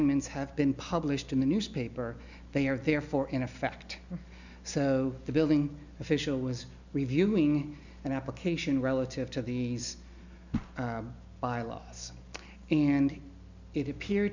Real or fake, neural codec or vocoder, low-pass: real; none; 7.2 kHz